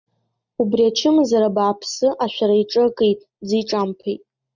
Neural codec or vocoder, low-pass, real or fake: none; 7.2 kHz; real